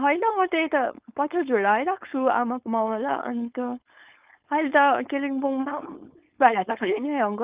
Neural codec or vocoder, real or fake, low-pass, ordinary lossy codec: codec, 16 kHz, 4.8 kbps, FACodec; fake; 3.6 kHz; Opus, 32 kbps